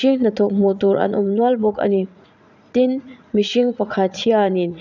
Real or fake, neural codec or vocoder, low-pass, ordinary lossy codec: fake; vocoder, 44.1 kHz, 80 mel bands, Vocos; 7.2 kHz; none